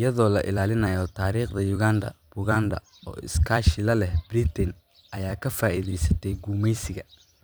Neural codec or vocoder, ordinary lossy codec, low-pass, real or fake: vocoder, 44.1 kHz, 128 mel bands every 256 samples, BigVGAN v2; none; none; fake